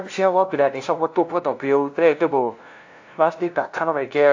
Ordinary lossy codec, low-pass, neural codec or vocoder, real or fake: AAC, 48 kbps; 7.2 kHz; codec, 16 kHz, 0.5 kbps, FunCodec, trained on LibriTTS, 25 frames a second; fake